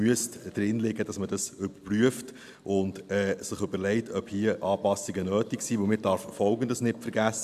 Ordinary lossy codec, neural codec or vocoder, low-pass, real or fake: AAC, 96 kbps; none; 14.4 kHz; real